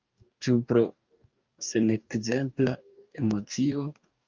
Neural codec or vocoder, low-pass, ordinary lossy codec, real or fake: codec, 44.1 kHz, 2.6 kbps, DAC; 7.2 kHz; Opus, 24 kbps; fake